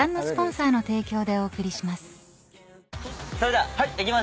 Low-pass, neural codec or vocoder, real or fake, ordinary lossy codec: none; none; real; none